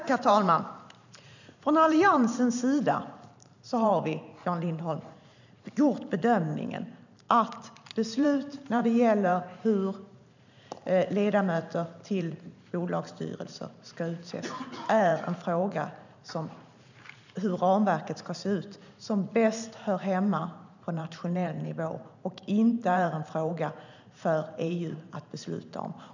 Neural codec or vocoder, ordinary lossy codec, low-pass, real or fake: vocoder, 44.1 kHz, 128 mel bands every 512 samples, BigVGAN v2; none; 7.2 kHz; fake